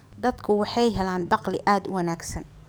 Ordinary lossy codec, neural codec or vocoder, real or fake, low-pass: none; codec, 44.1 kHz, 7.8 kbps, DAC; fake; none